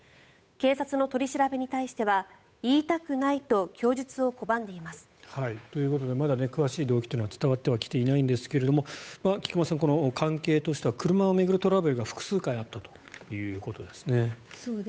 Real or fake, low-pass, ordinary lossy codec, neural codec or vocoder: fake; none; none; codec, 16 kHz, 8 kbps, FunCodec, trained on Chinese and English, 25 frames a second